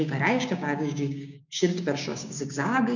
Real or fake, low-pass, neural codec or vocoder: fake; 7.2 kHz; codec, 16 kHz, 6 kbps, DAC